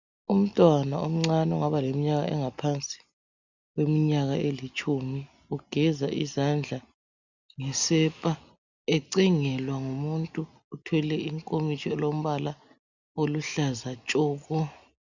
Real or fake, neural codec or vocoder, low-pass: real; none; 7.2 kHz